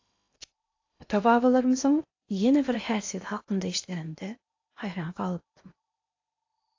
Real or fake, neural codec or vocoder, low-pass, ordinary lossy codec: fake; codec, 16 kHz in and 24 kHz out, 0.8 kbps, FocalCodec, streaming, 65536 codes; 7.2 kHz; AAC, 48 kbps